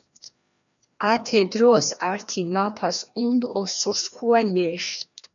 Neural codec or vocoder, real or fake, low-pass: codec, 16 kHz, 1 kbps, FreqCodec, larger model; fake; 7.2 kHz